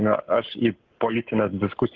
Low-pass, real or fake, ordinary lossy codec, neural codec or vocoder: 7.2 kHz; fake; Opus, 16 kbps; codec, 44.1 kHz, 7.8 kbps, Pupu-Codec